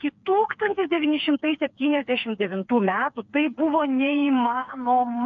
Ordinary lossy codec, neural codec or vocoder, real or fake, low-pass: MP3, 64 kbps; codec, 16 kHz, 4 kbps, FreqCodec, smaller model; fake; 7.2 kHz